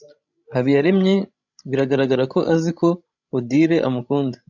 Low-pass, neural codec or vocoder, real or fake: 7.2 kHz; codec, 16 kHz, 16 kbps, FreqCodec, larger model; fake